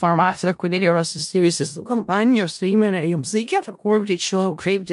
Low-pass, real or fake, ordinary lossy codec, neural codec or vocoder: 10.8 kHz; fake; MP3, 64 kbps; codec, 16 kHz in and 24 kHz out, 0.4 kbps, LongCat-Audio-Codec, four codebook decoder